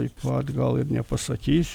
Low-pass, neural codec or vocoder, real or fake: 19.8 kHz; none; real